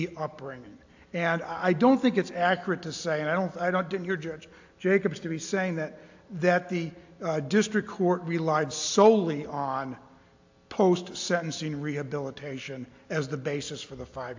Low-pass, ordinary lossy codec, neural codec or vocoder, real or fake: 7.2 kHz; AAC, 48 kbps; none; real